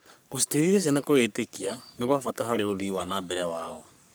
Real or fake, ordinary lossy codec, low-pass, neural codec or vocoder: fake; none; none; codec, 44.1 kHz, 3.4 kbps, Pupu-Codec